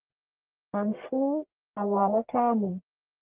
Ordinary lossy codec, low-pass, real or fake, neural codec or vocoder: Opus, 16 kbps; 3.6 kHz; fake; codec, 44.1 kHz, 1.7 kbps, Pupu-Codec